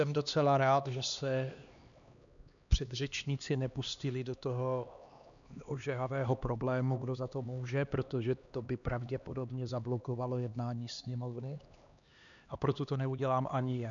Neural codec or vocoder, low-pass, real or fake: codec, 16 kHz, 2 kbps, X-Codec, HuBERT features, trained on LibriSpeech; 7.2 kHz; fake